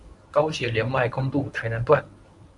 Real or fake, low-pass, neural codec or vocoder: fake; 10.8 kHz; codec, 24 kHz, 0.9 kbps, WavTokenizer, medium speech release version 1